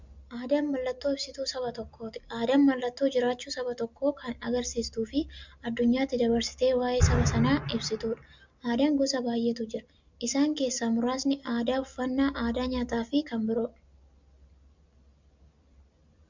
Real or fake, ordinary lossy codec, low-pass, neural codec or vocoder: real; MP3, 64 kbps; 7.2 kHz; none